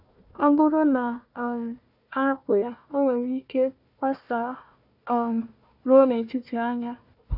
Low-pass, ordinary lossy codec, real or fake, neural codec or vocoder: 5.4 kHz; none; fake; codec, 16 kHz, 1 kbps, FunCodec, trained on Chinese and English, 50 frames a second